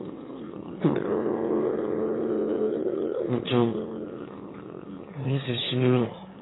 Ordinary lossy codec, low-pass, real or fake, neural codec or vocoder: AAC, 16 kbps; 7.2 kHz; fake; autoencoder, 22.05 kHz, a latent of 192 numbers a frame, VITS, trained on one speaker